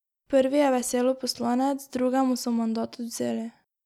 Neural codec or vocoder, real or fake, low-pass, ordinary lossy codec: none; real; 19.8 kHz; none